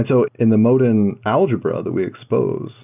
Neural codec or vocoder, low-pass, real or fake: none; 3.6 kHz; real